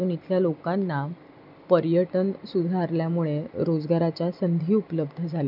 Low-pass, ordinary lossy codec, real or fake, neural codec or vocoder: 5.4 kHz; none; real; none